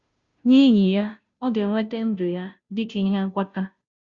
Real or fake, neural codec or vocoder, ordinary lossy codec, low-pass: fake; codec, 16 kHz, 0.5 kbps, FunCodec, trained on Chinese and English, 25 frames a second; Opus, 64 kbps; 7.2 kHz